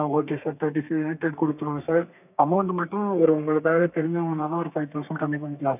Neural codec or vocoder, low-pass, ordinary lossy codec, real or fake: codec, 32 kHz, 1.9 kbps, SNAC; 3.6 kHz; none; fake